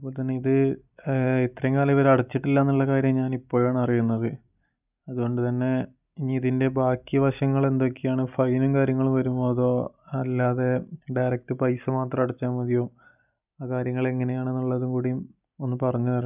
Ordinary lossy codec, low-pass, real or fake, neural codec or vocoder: none; 3.6 kHz; real; none